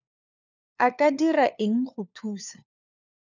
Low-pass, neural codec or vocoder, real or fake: 7.2 kHz; codec, 16 kHz, 4 kbps, FunCodec, trained on LibriTTS, 50 frames a second; fake